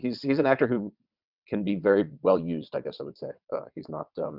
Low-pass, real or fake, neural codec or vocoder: 5.4 kHz; fake; vocoder, 22.05 kHz, 80 mel bands, WaveNeXt